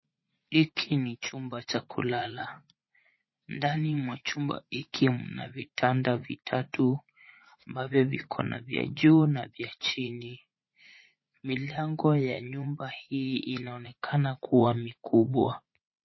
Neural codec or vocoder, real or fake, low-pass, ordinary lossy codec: vocoder, 44.1 kHz, 80 mel bands, Vocos; fake; 7.2 kHz; MP3, 24 kbps